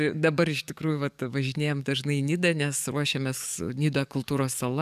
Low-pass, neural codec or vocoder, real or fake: 14.4 kHz; codec, 44.1 kHz, 7.8 kbps, DAC; fake